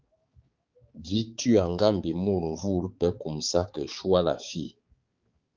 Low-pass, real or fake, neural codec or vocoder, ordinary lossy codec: 7.2 kHz; fake; codec, 16 kHz, 4 kbps, X-Codec, HuBERT features, trained on balanced general audio; Opus, 32 kbps